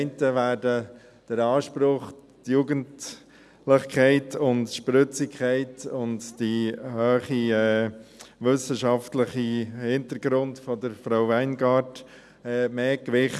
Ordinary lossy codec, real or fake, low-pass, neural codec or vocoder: none; real; none; none